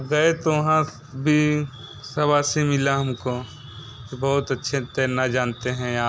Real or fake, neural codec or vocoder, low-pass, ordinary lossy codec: real; none; none; none